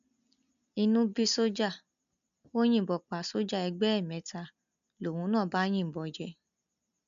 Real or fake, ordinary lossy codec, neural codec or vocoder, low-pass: real; none; none; 7.2 kHz